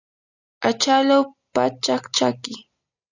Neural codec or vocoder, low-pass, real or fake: none; 7.2 kHz; real